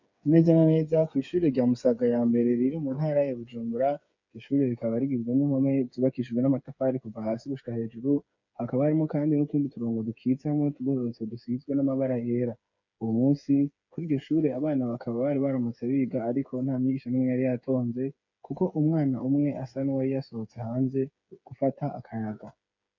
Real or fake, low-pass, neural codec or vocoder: fake; 7.2 kHz; codec, 16 kHz, 8 kbps, FreqCodec, smaller model